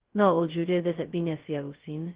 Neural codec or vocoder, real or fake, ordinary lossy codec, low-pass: codec, 16 kHz, 0.2 kbps, FocalCodec; fake; Opus, 16 kbps; 3.6 kHz